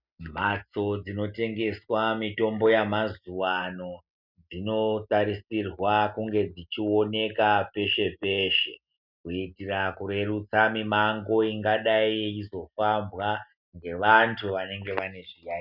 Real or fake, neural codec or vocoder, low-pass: real; none; 5.4 kHz